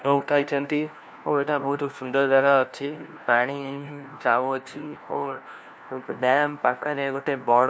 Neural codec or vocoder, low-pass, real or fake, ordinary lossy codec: codec, 16 kHz, 1 kbps, FunCodec, trained on LibriTTS, 50 frames a second; none; fake; none